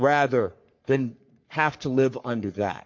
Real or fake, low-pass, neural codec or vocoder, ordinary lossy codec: fake; 7.2 kHz; codec, 44.1 kHz, 3.4 kbps, Pupu-Codec; MP3, 48 kbps